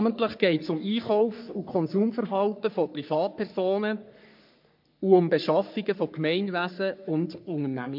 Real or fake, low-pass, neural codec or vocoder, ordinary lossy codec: fake; 5.4 kHz; codec, 44.1 kHz, 3.4 kbps, Pupu-Codec; MP3, 48 kbps